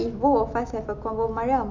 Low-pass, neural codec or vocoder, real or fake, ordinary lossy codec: 7.2 kHz; none; real; none